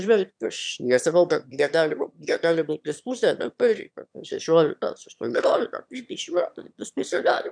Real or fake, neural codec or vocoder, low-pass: fake; autoencoder, 22.05 kHz, a latent of 192 numbers a frame, VITS, trained on one speaker; 9.9 kHz